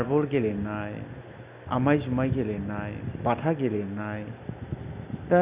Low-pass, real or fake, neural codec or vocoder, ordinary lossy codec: 3.6 kHz; real; none; Opus, 64 kbps